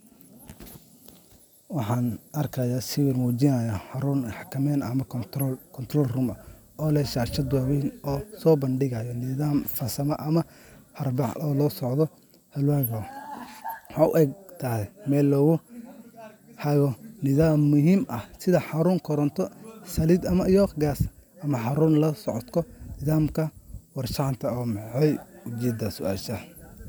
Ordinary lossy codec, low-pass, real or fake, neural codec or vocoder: none; none; real; none